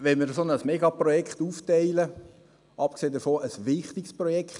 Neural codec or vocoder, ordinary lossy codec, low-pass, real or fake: none; none; 10.8 kHz; real